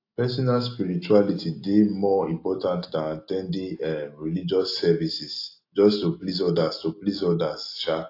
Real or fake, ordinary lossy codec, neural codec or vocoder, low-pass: real; AAC, 32 kbps; none; 5.4 kHz